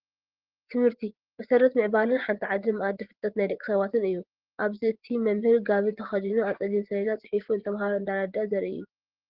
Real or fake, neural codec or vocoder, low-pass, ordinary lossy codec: fake; vocoder, 24 kHz, 100 mel bands, Vocos; 5.4 kHz; Opus, 16 kbps